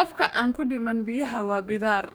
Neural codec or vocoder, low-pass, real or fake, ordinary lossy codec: codec, 44.1 kHz, 2.6 kbps, DAC; none; fake; none